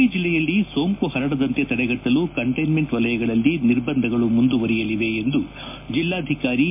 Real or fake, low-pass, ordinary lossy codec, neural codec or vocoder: real; 3.6 kHz; MP3, 32 kbps; none